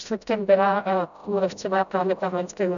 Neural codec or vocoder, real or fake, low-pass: codec, 16 kHz, 0.5 kbps, FreqCodec, smaller model; fake; 7.2 kHz